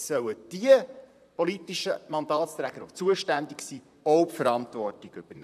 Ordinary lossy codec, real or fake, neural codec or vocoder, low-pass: none; fake; vocoder, 44.1 kHz, 128 mel bands, Pupu-Vocoder; 14.4 kHz